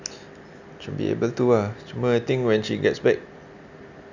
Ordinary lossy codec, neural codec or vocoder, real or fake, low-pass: none; none; real; 7.2 kHz